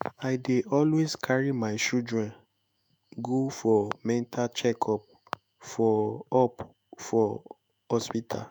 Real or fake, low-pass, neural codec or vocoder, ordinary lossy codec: fake; none; autoencoder, 48 kHz, 128 numbers a frame, DAC-VAE, trained on Japanese speech; none